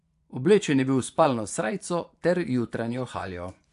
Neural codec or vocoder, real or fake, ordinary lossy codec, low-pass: vocoder, 24 kHz, 100 mel bands, Vocos; fake; AAC, 64 kbps; 10.8 kHz